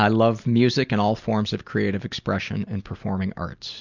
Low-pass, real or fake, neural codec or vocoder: 7.2 kHz; real; none